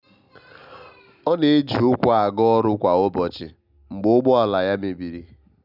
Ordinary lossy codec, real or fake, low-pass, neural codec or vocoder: none; real; 5.4 kHz; none